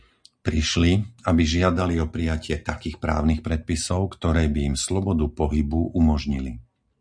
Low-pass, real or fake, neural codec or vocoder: 9.9 kHz; real; none